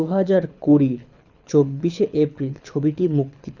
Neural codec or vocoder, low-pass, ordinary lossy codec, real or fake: codec, 24 kHz, 6 kbps, HILCodec; 7.2 kHz; none; fake